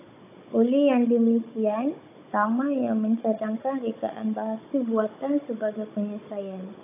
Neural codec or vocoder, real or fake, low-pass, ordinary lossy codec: codec, 16 kHz, 16 kbps, FunCodec, trained on Chinese and English, 50 frames a second; fake; 3.6 kHz; AAC, 24 kbps